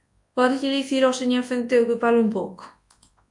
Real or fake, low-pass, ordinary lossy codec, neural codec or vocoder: fake; 10.8 kHz; AAC, 64 kbps; codec, 24 kHz, 0.9 kbps, WavTokenizer, large speech release